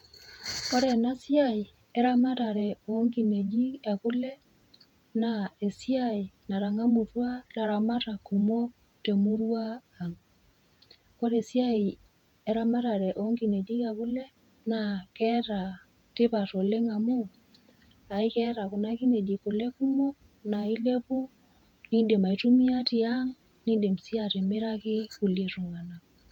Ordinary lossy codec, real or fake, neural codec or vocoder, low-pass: none; fake; vocoder, 48 kHz, 128 mel bands, Vocos; 19.8 kHz